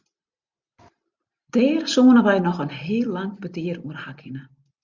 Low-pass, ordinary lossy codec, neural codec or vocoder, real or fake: 7.2 kHz; Opus, 64 kbps; none; real